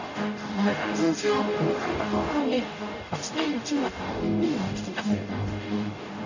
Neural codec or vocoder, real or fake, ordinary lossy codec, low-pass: codec, 44.1 kHz, 0.9 kbps, DAC; fake; none; 7.2 kHz